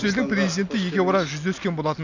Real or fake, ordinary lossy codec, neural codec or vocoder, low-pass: real; none; none; 7.2 kHz